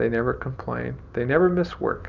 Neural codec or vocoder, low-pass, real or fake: none; 7.2 kHz; real